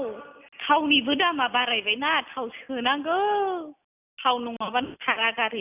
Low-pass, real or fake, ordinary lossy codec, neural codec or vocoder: 3.6 kHz; real; none; none